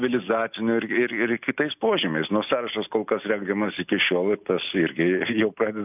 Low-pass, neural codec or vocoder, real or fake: 3.6 kHz; none; real